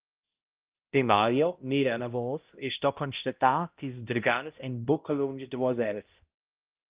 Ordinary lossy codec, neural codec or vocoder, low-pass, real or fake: Opus, 24 kbps; codec, 16 kHz, 0.5 kbps, X-Codec, HuBERT features, trained on balanced general audio; 3.6 kHz; fake